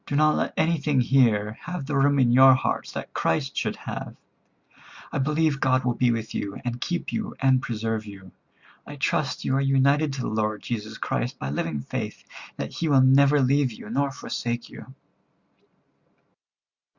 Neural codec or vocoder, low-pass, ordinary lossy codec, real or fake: none; 7.2 kHz; Opus, 64 kbps; real